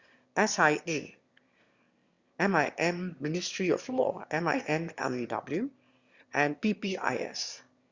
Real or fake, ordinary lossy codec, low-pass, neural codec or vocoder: fake; Opus, 64 kbps; 7.2 kHz; autoencoder, 22.05 kHz, a latent of 192 numbers a frame, VITS, trained on one speaker